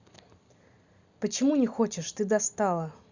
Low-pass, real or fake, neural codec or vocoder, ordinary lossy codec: 7.2 kHz; real; none; Opus, 64 kbps